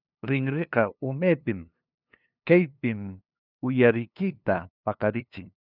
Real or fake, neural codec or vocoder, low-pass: fake; codec, 16 kHz, 2 kbps, FunCodec, trained on LibriTTS, 25 frames a second; 5.4 kHz